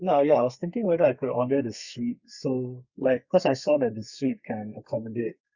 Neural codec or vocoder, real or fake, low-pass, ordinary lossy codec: codec, 32 kHz, 1.9 kbps, SNAC; fake; 7.2 kHz; Opus, 64 kbps